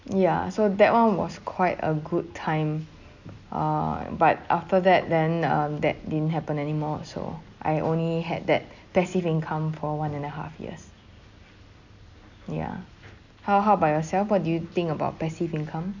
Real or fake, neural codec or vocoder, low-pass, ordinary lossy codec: real; none; 7.2 kHz; none